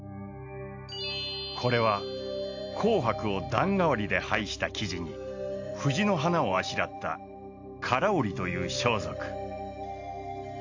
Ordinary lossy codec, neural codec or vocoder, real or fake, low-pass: AAC, 48 kbps; none; real; 7.2 kHz